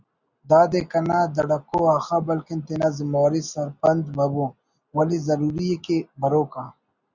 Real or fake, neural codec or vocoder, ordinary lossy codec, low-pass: real; none; Opus, 64 kbps; 7.2 kHz